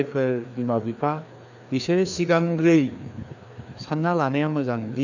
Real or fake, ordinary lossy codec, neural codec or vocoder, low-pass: fake; none; codec, 16 kHz, 2 kbps, FreqCodec, larger model; 7.2 kHz